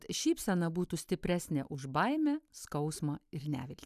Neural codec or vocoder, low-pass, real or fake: none; 14.4 kHz; real